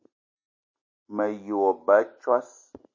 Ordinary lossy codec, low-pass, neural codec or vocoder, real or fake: MP3, 32 kbps; 7.2 kHz; none; real